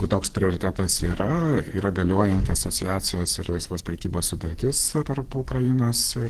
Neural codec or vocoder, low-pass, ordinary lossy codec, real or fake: codec, 44.1 kHz, 2.6 kbps, SNAC; 14.4 kHz; Opus, 16 kbps; fake